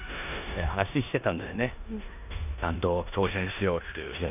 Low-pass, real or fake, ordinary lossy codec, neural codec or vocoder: 3.6 kHz; fake; none; codec, 16 kHz in and 24 kHz out, 0.9 kbps, LongCat-Audio-Codec, four codebook decoder